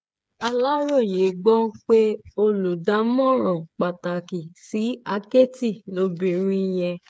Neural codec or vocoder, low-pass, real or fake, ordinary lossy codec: codec, 16 kHz, 8 kbps, FreqCodec, smaller model; none; fake; none